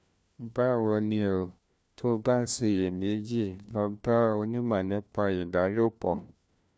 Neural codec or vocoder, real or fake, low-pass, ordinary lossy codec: codec, 16 kHz, 1 kbps, FunCodec, trained on LibriTTS, 50 frames a second; fake; none; none